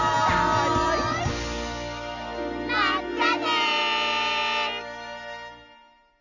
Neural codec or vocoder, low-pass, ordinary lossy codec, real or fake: none; 7.2 kHz; none; real